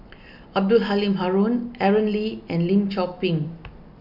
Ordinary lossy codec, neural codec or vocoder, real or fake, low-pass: none; none; real; 5.4 kHz